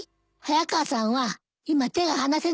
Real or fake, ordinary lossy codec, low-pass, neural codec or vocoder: real; none; none; none